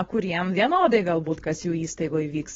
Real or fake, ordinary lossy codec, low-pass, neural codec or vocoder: fake; AAC, 24 kbps; 7.2 kHz; codec, 16 kHz, 4.8 kbps, FACodec